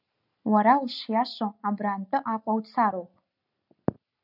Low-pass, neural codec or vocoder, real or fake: 5.4 kHz; none; real